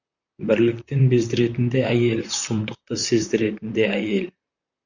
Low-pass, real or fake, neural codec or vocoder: 7.2 kHz; fake; vocoder, 44.1 kHz, 128 mel bands, Pupu-Vocoder